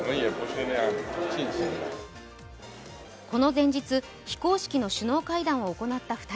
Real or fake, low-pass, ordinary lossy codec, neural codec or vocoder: real; none; none; none